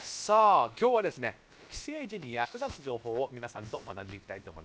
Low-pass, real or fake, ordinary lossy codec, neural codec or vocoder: none; fake; none; codec, 16 kHz, about 1 kbps, DyCAST, with the encoder's durations